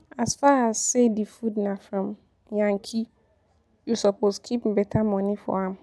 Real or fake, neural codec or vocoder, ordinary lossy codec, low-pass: real; none; none; none